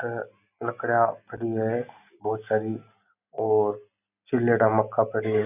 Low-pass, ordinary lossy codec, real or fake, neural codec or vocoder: 3.6 kHz; none; real; none